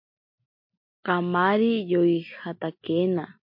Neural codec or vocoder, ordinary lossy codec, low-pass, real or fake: none; MP3, 32 kbps; 5.4 kHz; real